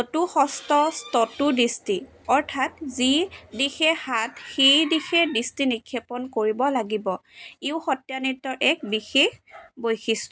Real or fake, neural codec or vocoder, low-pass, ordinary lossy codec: real; none; none; none